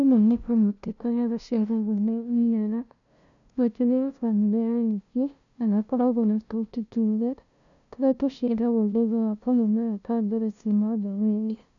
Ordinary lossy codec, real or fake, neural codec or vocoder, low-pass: none; fake; codec, 16 kHz, 0.5 kbps, FunCodec, trained on LibriTTS, 25 frames a second; 7.2 kHz